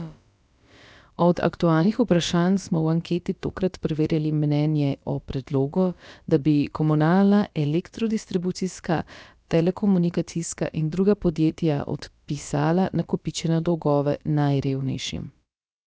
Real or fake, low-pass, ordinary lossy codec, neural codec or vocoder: fake; none; none; codec, 16 kHz, about 1 kbps, DyCAST, with the encoder's durations